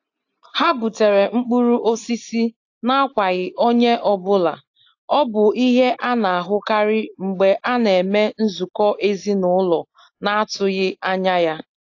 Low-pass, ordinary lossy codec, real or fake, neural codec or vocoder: 7.2 kHz; AAC, 48 kbps; real; none